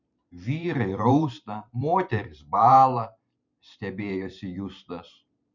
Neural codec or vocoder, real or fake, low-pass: vocoder, 44.1 kHz, 128 mel bands every 512 samples, BigVGAN v2; fake; 7.2 kHz